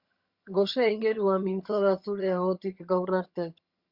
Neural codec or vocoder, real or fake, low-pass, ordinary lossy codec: vocoder, 22.05 kHz, 80 mel bands, HiFi-GAN; fake; 5.4 kHz; Opus, 64 kbps